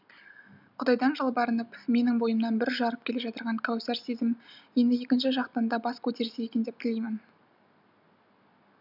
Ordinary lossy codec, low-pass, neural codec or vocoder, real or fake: none; 5.4 kHz; none; real